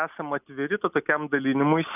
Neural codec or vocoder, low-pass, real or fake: none; 3.6 kHz; real